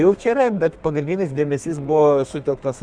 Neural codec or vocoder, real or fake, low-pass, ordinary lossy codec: codec, 32 kHz, 1.9 kbps, SNAC; fake; 9.9 kHz; Opus, 64 kbps